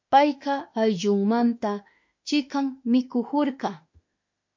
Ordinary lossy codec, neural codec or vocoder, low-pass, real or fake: MP3, 48 kbps; autoencoder, 48 kHz, 32 numbers a frame, DAC-VAE, trained on Japanese speech; 7.2 kHz; fake